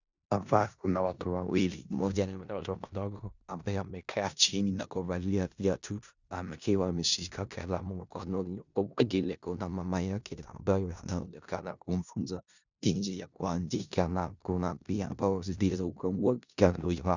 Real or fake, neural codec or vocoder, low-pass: fake; codec, 16 kHz in and 24 kHz out, 0.4 kbps, LongCat-Audio-Codec, four codebook decoder; 7.2 kHz